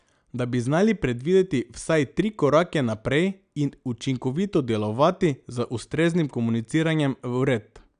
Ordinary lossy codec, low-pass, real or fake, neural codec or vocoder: none; 9.9 kHz; real; none